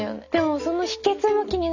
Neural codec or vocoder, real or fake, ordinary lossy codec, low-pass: none; real; none; 7.2 kHz